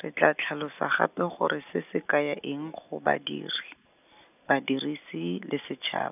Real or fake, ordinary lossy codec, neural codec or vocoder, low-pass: real; none; none; 3.6 kHz